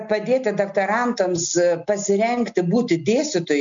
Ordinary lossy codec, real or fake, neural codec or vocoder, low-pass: MP3, 64 kbps; real; none; 7.2 kHz